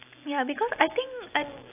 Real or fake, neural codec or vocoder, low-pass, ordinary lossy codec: real; none; 3.6 kHz; AAC, 24 kbps